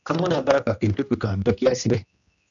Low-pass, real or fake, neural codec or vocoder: 7.2 kHz; fake; codec, 16 kHz, 1 kbps, X-Codec, HuBERT features, trained on general audio